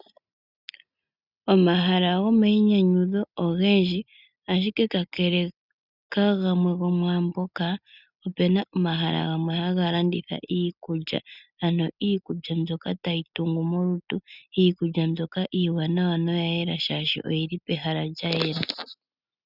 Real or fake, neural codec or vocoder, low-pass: real; none; 5.4 kHz